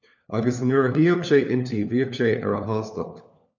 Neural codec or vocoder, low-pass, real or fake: codec, 16 kHz, 4 kbps, FunCodec, trained on LibriTTS, 50 frames a second; 7.2 kHz; fake